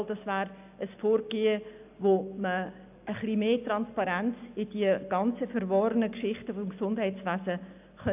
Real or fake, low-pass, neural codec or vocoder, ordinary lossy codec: real; 3.6 kHz; none; AAC, 32 kbps